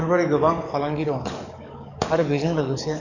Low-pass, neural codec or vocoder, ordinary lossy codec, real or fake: 7.2 kHz; codec, 44.1 kHz, 7.8 kbps, DAC; none; fake